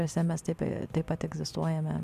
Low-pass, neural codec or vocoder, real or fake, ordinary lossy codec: 14.4 kHz; none; real; MP3, 96 kbps